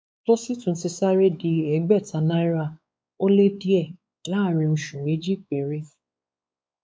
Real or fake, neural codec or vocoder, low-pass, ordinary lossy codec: fake; codec, 16 kHz, 4 kbps, X-Codec, WavLM features, trained on Multilingual LibriSpeech; none; none